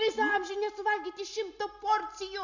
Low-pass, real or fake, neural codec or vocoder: 7.2 kHz; real; none